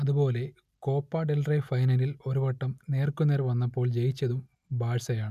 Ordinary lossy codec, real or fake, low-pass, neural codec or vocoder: none; real; 14.4 kHz; none